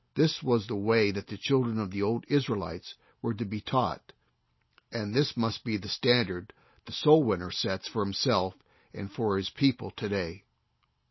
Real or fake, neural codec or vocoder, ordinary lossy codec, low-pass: real; none; MP3, 24 kbps; 7.2 kHz